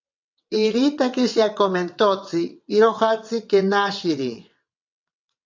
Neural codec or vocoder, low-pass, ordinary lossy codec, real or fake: vocoder, 22.05 kHz, 80 mel bands, WaveNeXt; 7.2 kHz; MP3, 64 kbps; fake